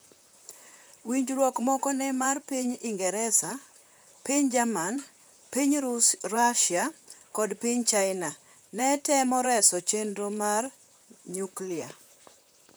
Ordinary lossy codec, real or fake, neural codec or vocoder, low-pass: none; fake; vocoder, 44.1 kHz, 128 mel bands, Pupu-Vocoder; none